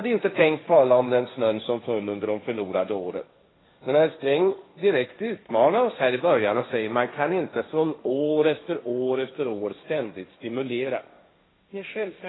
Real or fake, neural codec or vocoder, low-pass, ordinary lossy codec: fake; codec, 16 kHz, 1.1 kbps, Voila-Tokenizer; 7.2 kHz; AAC, 16 kbps